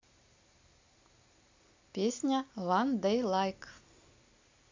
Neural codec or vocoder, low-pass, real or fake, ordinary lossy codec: none; 7.2 kHz; real; MP3, 48 kbps